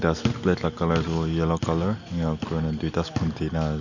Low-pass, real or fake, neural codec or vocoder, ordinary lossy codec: 7.2 kHz; real; none; none